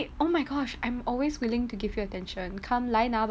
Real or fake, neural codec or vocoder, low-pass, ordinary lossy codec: real; none; none; none